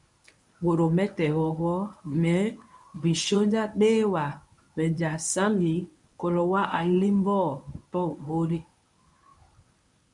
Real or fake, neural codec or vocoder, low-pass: fake; codec, 24 kHz, 0.9 kbps, WavTokenizer, medium speech release version 1; 10.8 kHz